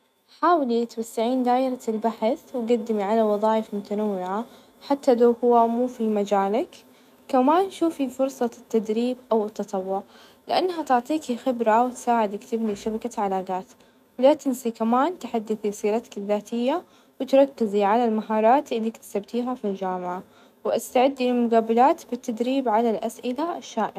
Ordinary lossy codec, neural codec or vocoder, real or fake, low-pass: none; autoencoder, 48 kHz, 128 numbers a frame, DAC-VAE, trained on Japanese speech; fake; 14.4 kHz